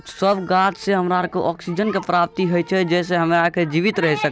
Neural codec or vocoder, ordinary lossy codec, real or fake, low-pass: none; none; real; none